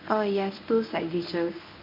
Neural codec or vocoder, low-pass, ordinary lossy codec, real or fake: codec, 24 kHz, 0.9 kbps, WavTokenizer, medium speech release version 1; 5.4 kHz; MP3, 48 kbps; fake